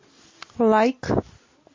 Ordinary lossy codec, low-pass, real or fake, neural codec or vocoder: MP3, 32 kbps; 7.2 kHz; real; none